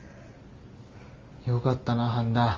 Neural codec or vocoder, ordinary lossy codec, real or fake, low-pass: none; Opus, 32 kbps; real; 7.2 kHz